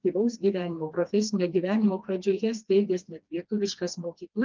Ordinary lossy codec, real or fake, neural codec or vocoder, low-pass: Opus, 24 kbps; fake; codec, 16 kHz, 2 kbps, FreqCodec, smaller model; 7.2 kHz